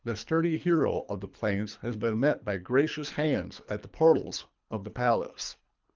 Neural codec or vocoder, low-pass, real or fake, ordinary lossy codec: codec, 24 kHz, 3 kbps, HILCodec; 7.2 kHz; fake; Opus, 24 kbps